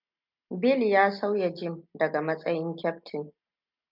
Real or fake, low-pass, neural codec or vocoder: real; 5.4 kHz; none